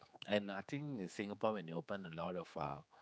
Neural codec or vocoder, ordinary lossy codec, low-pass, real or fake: codec, 16 kHz, 4 kbps, X-Codec, HuBERT features, trained on general audio; none; none; fake